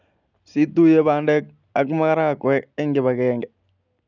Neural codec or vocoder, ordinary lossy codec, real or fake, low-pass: none; none; real; 7.2 kHz